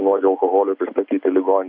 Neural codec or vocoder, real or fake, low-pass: none; real; 5.4 kHz